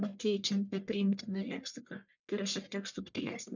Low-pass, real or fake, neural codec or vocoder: 7.2 kHz; fake; codec, 44.1 kHz, 1.7 kbps, Pupu-Codec